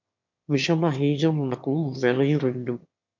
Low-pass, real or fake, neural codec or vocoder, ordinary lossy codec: 7.2 kHz; fake; autoencoder, 22.05 kHz, a latent of 192 numbers a frame, VITS, trained on one speaker; MP3, 48 kbps